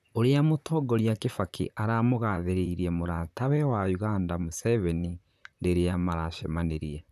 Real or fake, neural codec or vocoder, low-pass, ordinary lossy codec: fake; vocoder, 44.1 kHz, 128 mel bands, Pupu-Vocoder; 14.4 kHz; none